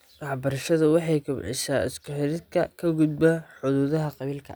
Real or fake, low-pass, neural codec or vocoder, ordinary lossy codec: real; none; none; none